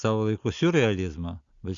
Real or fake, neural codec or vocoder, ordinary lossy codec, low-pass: real; none; Opus, 64 kbps; 7.2 kHz